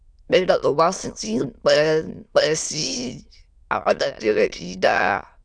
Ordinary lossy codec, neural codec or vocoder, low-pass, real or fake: none; autoencoder, 22.05 kHz, a latent of 192 numbers a frame, VITS, trained on many speakers; 9.9 kHz; fake